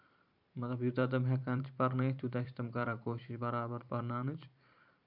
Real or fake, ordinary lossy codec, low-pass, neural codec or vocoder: fake; none; 5.4 kHz; vocoder, 44.1 kHz, 128 mel bands every 512 samples, BigVGAN v2